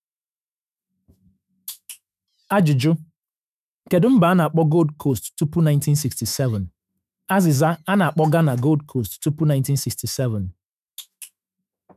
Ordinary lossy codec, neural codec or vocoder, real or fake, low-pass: none; autoencoder, 48 kHz, 128 numbers a frame, DAC-VAE, trained on Japanese speech; fake; 14.4 kHz